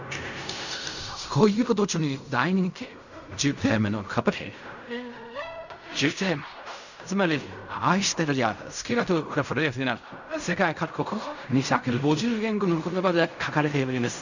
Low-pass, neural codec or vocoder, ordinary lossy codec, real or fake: 7.2 kHz; codec, 16 kHz in and 24 kHz out, 0.4 kbps, LongCat-Audio-Codec, fine tuned four codebook decoder; none; fake